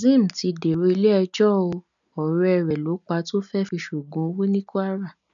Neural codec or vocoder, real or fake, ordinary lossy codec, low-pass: none; real; none; 7.2 kHz